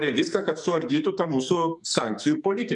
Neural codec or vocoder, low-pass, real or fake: codec, 44.1 kHz, 2.6 kbps, SNAC; 10.8 kHz; fake